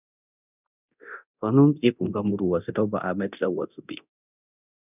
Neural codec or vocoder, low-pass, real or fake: codec, 24 kHz, 0.9 kbps, DualCodec; 3.6 kHz; fake